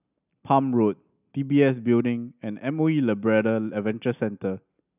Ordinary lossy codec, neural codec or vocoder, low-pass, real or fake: none; none; 3.6 kHz; real